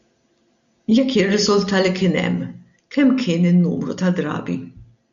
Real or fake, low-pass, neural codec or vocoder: real; 7.2 kHz; none